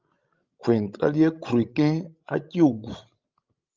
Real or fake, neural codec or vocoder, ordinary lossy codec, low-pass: fake; codec, 16 kHz, 16 kbps, FreqCodec, larger model; Opus, 24 kbps; 7.2 kHz